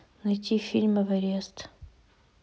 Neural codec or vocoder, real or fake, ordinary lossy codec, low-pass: none; real; none; none